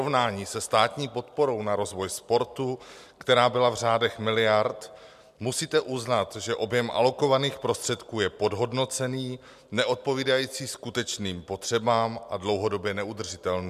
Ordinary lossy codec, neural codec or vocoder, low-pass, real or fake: MP3, 96 kbps; none; 14.4 kHz; real